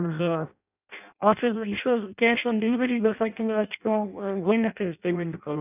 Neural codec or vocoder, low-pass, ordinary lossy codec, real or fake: codec, 16 kHz in and 24 kHz out, 0.6 kbps, FireRedTTS-2 codec; 3.6 kHz; none; fake